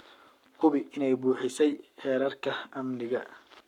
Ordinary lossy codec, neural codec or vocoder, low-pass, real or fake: none; codec, 44.1 kHz, 7.8 kbps, Pupu-Codec; 19.8 kHz; fake